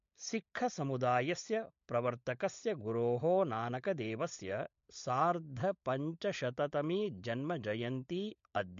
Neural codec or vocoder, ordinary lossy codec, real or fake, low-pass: codec, 16 kHz, 16 kbps, FunCodec, trained on LibriTTS, 50 frames a second; MP3, 48 kbps; fake; 7.2 kHz